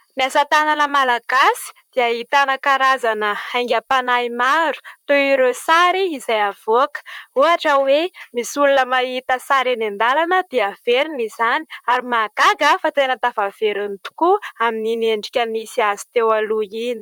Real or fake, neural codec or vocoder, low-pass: fake; vocoder, 44.1 kHz, 128 mel bands, Pupu-Vocoder; 19.8 kHz